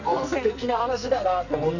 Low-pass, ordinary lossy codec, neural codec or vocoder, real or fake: 7.2 kHz; none; codec, 44.1 kHz, 2.6 kbps, SNAC; fake